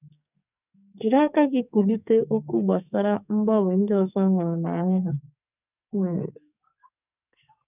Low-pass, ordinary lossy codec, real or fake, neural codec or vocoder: 3.6 kHz; none; fake; codec, 44.1 kHz, 2.6 kbps, SNAC